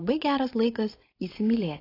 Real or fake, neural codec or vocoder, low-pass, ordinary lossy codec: fake; codec, 16 kHz, 4.8 kbps, FACodec; 5.4 kHz; AAC, 24 kbps